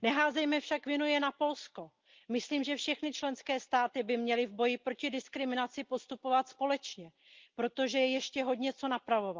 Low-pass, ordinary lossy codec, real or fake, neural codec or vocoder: 7.2 kHz; Opus, 32 kbps; real; none